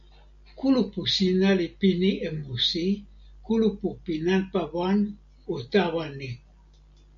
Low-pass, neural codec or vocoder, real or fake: 7.2 kHz; none; real